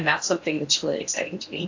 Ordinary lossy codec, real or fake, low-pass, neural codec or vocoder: AAC, 48 kbps; fake; 7.2 kHz; codec, 16 kHz in and 24 kHz out, 0.8 kbps, FocalCodec, streaming, 65536 codes